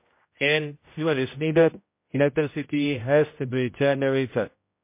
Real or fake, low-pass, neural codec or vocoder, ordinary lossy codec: fake; 3.6 kHz; codec, 16 kHz, 0.5 kbps, X-Codec, HuBERT features, trained on general audio; MP3, 24 kbps